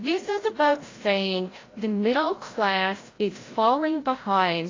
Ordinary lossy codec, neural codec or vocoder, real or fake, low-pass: AAC, 32 kbps; codec, 16 kHz, 0.5 kbps, FreqCodec, larger model; fake; 7.2 kHz